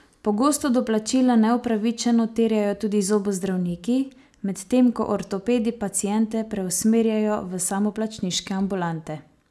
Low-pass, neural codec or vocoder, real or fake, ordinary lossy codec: none; none; real; none